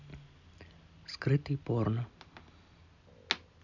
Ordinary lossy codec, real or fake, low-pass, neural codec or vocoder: none; real; 7.2 kHz; none